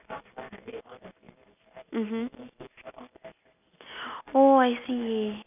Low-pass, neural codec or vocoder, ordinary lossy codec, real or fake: 3.6 kHz; none; none; real